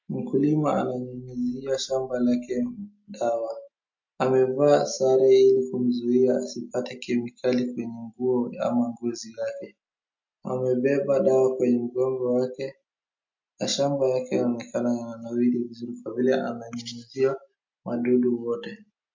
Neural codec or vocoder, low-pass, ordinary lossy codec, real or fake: none; 7.2 kHz; MP3, 48 kbps; real